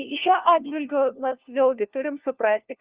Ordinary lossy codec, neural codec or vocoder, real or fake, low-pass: Opus, 32 kbps; codec, 16 kHz, 1 kbps, FunCodec, trained on LibriTTS, 50 frames a second; fake; 3.6 kHz